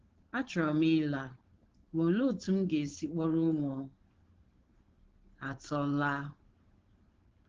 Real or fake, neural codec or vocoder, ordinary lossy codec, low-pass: fake; codec, 16 kHz, 4.8 kbps, FACodec; Opus, 16 kbps; 7.2 kHz